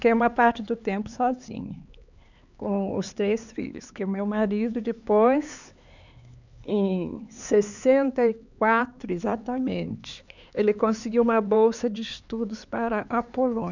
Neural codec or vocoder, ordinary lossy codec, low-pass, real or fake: codec, 16 kHz, 4 kbps, X-Codec, HuBERT features, trained on LibriSpeech; none; 7.2 kHz; fake